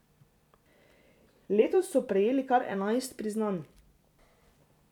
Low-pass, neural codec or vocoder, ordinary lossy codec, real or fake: 19.8 kHz; vocoder, 44.1 kHz, 128 mel bands every 256 samples, BigVGAN v2; none; fake